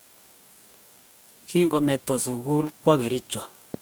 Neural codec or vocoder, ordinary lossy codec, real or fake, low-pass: codec, 44.1 kHz, 2.6 kbps, DAC; none; fake; none